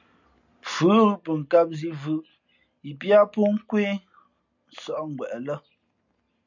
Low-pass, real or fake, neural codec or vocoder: 7.2 kHz; real; none